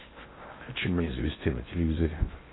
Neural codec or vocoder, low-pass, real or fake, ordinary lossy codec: codec, 16 kHz in and 24 kHz out, 0.6 kbps, FocalCodec, streaming, 4096 codes; 7.2 kHz; fake; AAC, 16 kbps